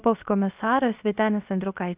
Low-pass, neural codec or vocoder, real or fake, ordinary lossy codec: 3.6 kHz; codec, 16 kHz, 0.7 kbps, FocalCodec; fake; Opus, 24 kbps